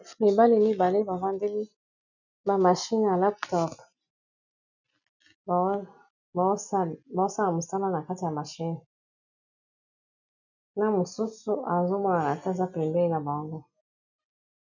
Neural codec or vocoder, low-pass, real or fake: none; 7.2 kHz; real